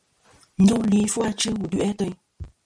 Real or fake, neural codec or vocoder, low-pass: real; none; 9.9 kHz